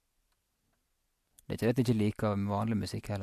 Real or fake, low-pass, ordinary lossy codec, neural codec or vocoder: real; 14.4 kHz; MP3, 64 kbps; none